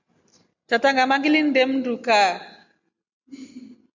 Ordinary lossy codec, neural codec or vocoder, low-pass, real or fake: MP3, 64 kbps; none; 7.2 kHz; real